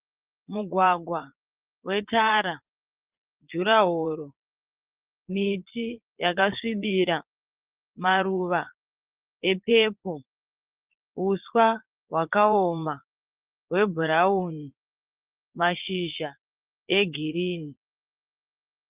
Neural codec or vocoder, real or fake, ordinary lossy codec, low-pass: vocoder, 22.05 kHz, 80 mel bands, Vocos; fake; Opus, 32 kbps; 3.6 kHz